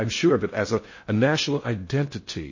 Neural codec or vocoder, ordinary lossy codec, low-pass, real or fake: codec, 16 kHz in and 24 kHz out, 0.6 kbps, FocalCodec, streaming, 4096 codes; MP3, 32 kbps; 7.2 kHz; fake